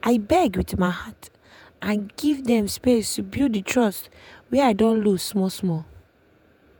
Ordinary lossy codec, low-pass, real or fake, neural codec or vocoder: none; none; fake; vocoder, 48 kHz, 128 mel bands, Vocos